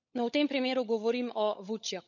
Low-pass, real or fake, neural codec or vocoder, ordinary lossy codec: 7.2 kHz; fake; codec, 16 kHz, 16 kbps, FunCodec, trained on LibriTTS, 50 frames a second; none